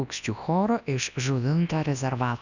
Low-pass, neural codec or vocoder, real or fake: 7.2 kHz; codec, 24 kHz, 0.9 kbps, WavTokenizer, large speech release; fake